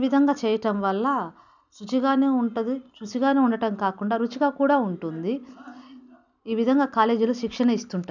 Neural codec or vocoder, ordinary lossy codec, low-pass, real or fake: none; none; 7.2 kHz; real